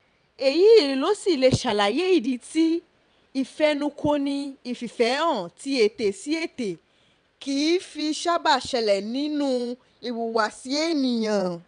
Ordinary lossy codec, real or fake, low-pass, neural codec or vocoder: none; fake; 9.9 kHz; vocoder, 22.05 kHz, 80 mel bands, WaveNeXt